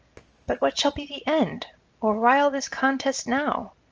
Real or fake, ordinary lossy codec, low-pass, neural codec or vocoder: real; Opus, 24 kbps; 7.2 kHz; none